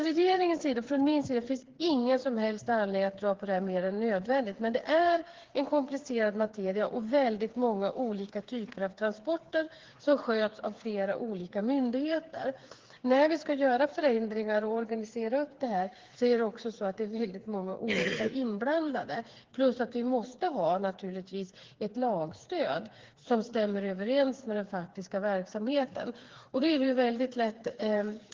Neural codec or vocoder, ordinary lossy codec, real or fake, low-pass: codec, 16 kHz, 4 kbps, FreqCodec, smaller model; Opus, 16 kbps; fake; 7.2 kHz